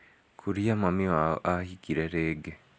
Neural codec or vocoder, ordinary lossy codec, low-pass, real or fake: none; none; none; real